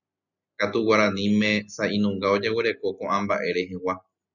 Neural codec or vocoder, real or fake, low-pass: none; real; 7.2 kHz